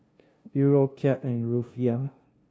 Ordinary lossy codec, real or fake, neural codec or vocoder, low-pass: none; fake; codec, 16 kHz, 0.5 kbps, FunCodec, trained on LibriTTS, 25 frames a second; none